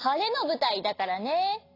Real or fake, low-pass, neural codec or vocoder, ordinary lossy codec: real; 5.4 kHz; none; AAC, 32 kbps